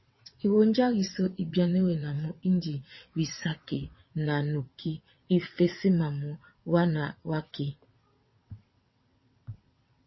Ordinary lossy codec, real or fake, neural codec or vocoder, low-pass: MP3, 24 kbps; fake; vocoder, 22.05 kHz, 80 mel bands, WaveNeXt; 7.2 kHz